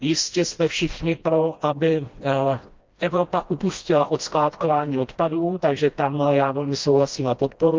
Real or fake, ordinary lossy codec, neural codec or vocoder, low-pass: fake; Opus, 32 kbps; codec, 16 kHz, 1 kbps, FreqCodec, smaller model; 7.2 kHz